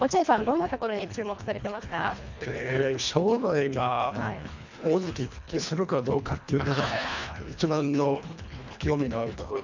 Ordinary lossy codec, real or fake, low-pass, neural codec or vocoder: MP3, 64 kbps; fake; 7.2 kHz; codec, 24 kHz, 1.5 kbps, HILCodec